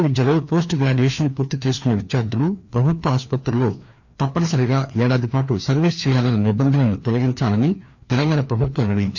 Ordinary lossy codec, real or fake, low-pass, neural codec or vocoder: none; fake; 7.2 kHz; codec, 16 kHz, 2 kbps, FreqCodec, larger model